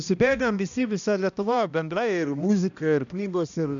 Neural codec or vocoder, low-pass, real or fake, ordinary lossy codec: codec, 16 kHz, 1 kbps, X-Codec, HuBERT features, trained on balanced general audio; 7.2 kHz; fake; MP3, 96 kbps